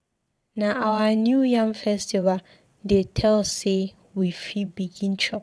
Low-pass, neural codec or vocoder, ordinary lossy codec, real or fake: none; vocoder, 22.05 kHz, 80 mel bands, WaveNeXt; none; fake